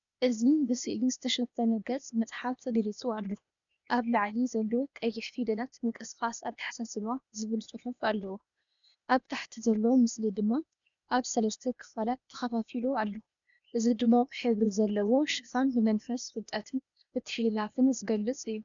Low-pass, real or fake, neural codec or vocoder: 7.2 kHz; fake; codec, 16 kHz, 0.8 kbps, ZipCodec